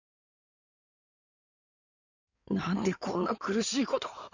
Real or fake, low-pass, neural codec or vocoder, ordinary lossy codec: fake; 7.2 kHz; codec, 16 kHz in and 24 kHz out, 2.2 kbps, FireRedTTS-2 codec; none